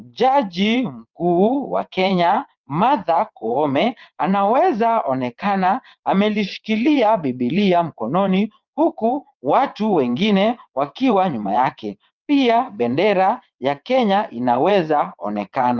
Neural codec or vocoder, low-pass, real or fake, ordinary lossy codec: vocoder, 22.05 kHz, 80 mel bands, WaveNeXt; 7.2 kHz; fake; Opus, 24 kbps